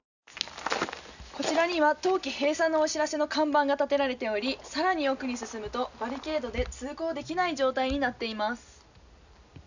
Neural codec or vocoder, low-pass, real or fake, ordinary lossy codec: none; 7.2 kHz; real; none